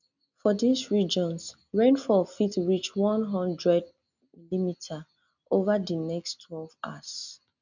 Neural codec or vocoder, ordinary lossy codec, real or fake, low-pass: none; none; real; 7.2 kHz